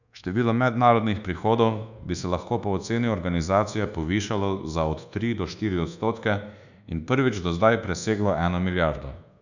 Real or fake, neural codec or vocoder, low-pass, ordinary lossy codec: fake; codec, 24 kHz, 1.2 kbps, DualCodec; 7.2 kHz; none